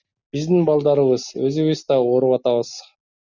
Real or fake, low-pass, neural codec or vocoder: real; 7.2 kHz; none